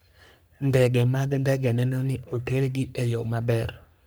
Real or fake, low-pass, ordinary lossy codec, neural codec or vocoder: fake; none; none; codec, 44.1 kHz, 3.4 kbps, Pupu-Codec